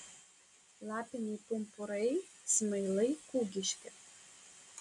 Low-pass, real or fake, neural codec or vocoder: 10.8 kHz; real; none